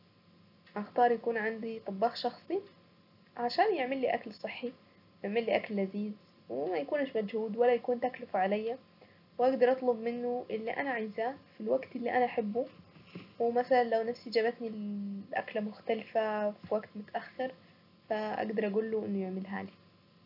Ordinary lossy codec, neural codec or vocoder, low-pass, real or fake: none; none; 5.4 kHz; real